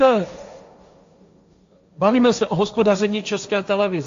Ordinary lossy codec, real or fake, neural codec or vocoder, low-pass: AAC, 64 kbps; fake; codec, 16 kHz, 1.1 kbps, Voila-Tokenizer; 7.2 kHz